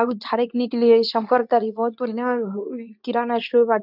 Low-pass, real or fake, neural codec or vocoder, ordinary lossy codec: 5.4 kHz; fake; codec, 24 kHz, 0.9 kbps, WavTokenizer, medium speech release version 2; none